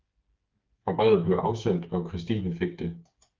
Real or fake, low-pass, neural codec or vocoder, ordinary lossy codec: fake; 7.2 kHz; codec, 16 kHz, 4 kbps, FreqCodec, smaller model; Opus, 32 kbps